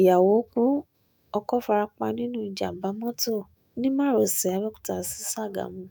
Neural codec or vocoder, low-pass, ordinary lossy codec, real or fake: autoencoder, 48 kHz, 128 numbers a frame, DAC-VAE, trained on Japanese speech; none; none; fake